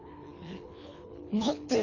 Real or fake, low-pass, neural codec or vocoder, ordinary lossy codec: fake; 7.2 kHz; codec, 24 kHz, 3 kbps, HILCodec; none